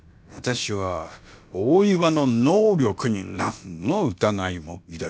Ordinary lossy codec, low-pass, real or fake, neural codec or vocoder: none; none; fake; codec, 16 kHz, about 1 kbps, DyCAST, with the encoder's durations